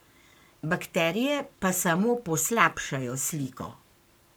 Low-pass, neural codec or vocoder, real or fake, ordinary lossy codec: none; codec, 44.1 kHz, 7.8 kbps, Pupu-Codec; fake; none